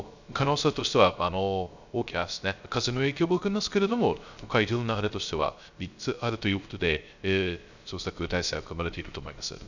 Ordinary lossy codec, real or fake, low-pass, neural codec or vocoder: none; fake; 7.2 kHz; codec, 16 kHz, 0.3 kbps, FocalCodec